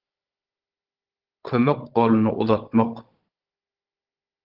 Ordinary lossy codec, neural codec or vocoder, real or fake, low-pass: Opus, 16 kbps; codec, 16 kHz, 16 kbps, FunCodec, trained on Chinese and English, 50 frames a second; fake; 5.4 kHz